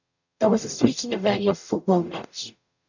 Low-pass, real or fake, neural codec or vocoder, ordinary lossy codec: 7.2 kHz; fake; codec, 44.1 kHz, 0.9 kbps, DAC; none